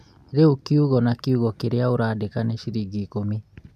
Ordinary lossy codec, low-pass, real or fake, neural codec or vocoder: none; 14.4 kHz; real; none